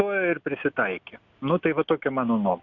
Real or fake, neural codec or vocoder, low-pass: real; none; 7.2 kHz